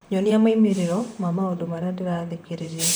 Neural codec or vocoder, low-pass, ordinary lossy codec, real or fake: vocoder, 44.1 kHz, 128 mel bands, Pupu-Vocoder; none; none; fake